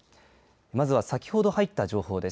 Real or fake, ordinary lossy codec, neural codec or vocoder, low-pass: real; none; none; none